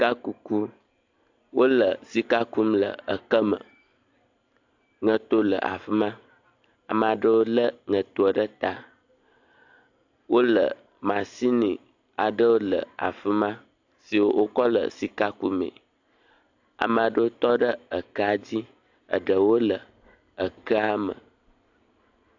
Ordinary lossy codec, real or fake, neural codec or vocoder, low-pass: AAC, 48 kbps; real; none; 7.2 kHz